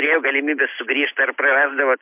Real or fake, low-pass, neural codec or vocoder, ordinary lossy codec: real; 3.6 kHz; none; MP3, 32 kbps